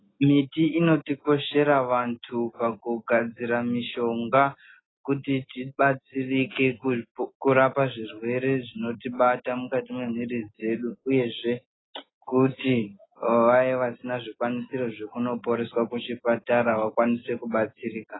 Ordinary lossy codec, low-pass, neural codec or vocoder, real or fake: AAC, 16 kbps; 7.2 kHz; none; real